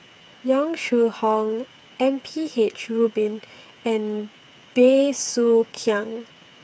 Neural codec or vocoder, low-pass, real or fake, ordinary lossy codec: codec, 16 kHz, 8 kbps, FreqCodec, smaller model; none; fake; none